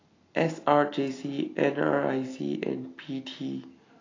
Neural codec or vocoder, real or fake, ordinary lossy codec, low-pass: none; real; AAC, 32 kbps; 7.2 kHz